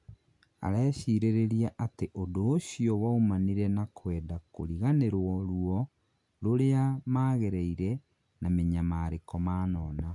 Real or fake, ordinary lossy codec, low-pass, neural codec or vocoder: real; MP3, 64 kbps; 10.8 kHz; none